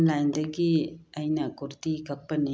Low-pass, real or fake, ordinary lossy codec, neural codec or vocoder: none; real; none; none